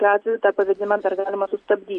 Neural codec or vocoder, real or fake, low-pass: none; real; 9.9 kHz